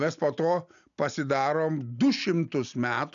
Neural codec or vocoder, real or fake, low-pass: none; real; 7.2 kHz